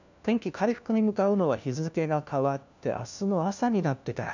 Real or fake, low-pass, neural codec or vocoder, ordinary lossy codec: fake; 7.2 kHz; codec, 16 kHz, 1 kbps, FunCodec, trained on LibriTTS, 50 frames a second; none